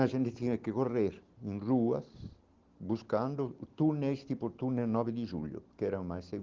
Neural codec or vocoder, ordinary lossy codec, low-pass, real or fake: none; Opus, 16 kbps; 7.2 kHz; real